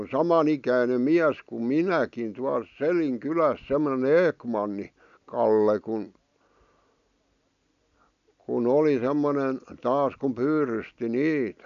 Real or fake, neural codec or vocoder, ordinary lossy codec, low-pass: real; none; none; 7.2 kHz